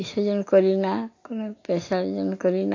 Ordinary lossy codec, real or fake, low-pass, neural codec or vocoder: AAC, 48 kbps; real; 7.2 kHz; none